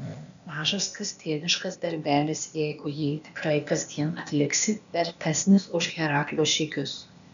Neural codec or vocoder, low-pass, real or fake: codec, 16 kHz, 0.8 kbps, ZipCodec; 7.2 kHz; fake